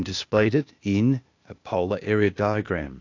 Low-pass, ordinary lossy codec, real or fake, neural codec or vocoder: 7.2 kHz; AAC, 48 kbps; fake; codec, 16 kHz, 0.8 kbps, ZipCodec